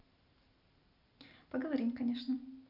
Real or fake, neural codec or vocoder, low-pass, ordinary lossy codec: real; none; 5.4 kHz; none